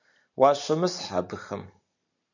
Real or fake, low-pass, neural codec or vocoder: real; 7.2 kHz; none